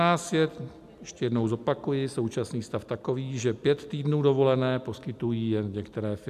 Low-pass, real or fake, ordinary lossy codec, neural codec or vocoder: 14.4 kHz; real; AAC, 96 kbps; none